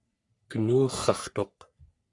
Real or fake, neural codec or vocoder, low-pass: fake; codec, 44.1 kHz, 3.4 kbps, Pupu-Codec; 10.8 kHz